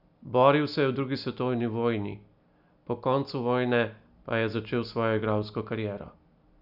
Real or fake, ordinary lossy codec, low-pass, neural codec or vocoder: real; none; 5.4 kHz; none